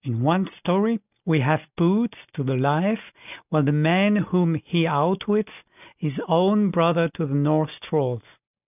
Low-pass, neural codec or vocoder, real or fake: 3.6 kHz; none; real